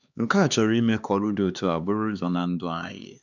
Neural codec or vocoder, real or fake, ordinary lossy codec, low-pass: codec, 16 kHz, 2 kbps, X-Codec, HuBERT features, trained on LibriSpeech; fake; none; 7.2 kHz